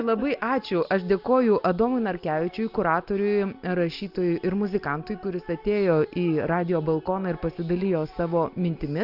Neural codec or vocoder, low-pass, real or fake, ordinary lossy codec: none; 5.4 kHz; real; Opus, 64 kbps